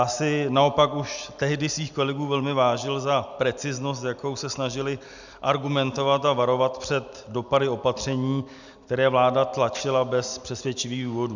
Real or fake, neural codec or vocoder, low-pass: real; none; 7.2 kHz